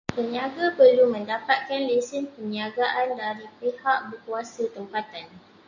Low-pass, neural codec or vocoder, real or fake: 7.2 kHz; none; real